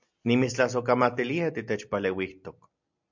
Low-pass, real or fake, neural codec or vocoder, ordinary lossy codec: 7.2 kHz; real; none; MP3, 64 kbps